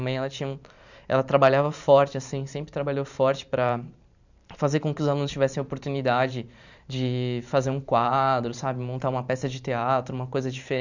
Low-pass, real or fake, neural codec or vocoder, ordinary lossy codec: 7.2 kHz; fake; vocoder, 44.1 kHz, 128 mel bands every 512 samples, BigVGAN v2; none